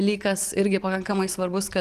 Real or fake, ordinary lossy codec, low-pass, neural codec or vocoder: fake; Opus, 24 kbps; 14.4 kHz; vocoder, 44.1 kHz, 128 mel bands every 512 samples, BigVGAN v2